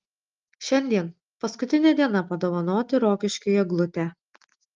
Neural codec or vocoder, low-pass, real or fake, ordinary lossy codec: none; 7.2 kHz; real; Opus, 24 kbps